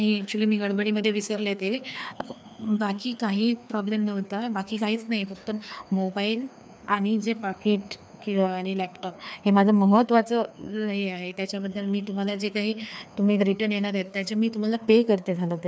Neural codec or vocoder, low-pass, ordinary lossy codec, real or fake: codec, 16 kHz, 2 kbps, FreqCodec, larger model; none; none; fake